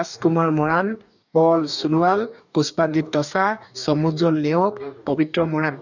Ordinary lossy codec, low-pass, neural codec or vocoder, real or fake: none; 7.2 kHz; codec, 44.1 kHz, 2.6 kbps, DAC; fake